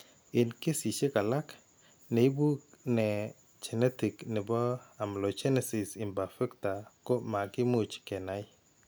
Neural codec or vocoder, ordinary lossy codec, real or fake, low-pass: none; none; real; none